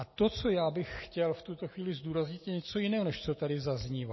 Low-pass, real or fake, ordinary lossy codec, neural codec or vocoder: 7.2 kHz; real; MP3, 24 kbps; none